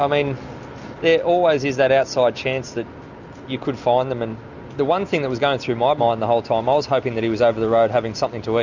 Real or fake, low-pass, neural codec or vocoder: real; 7.2 kHz; none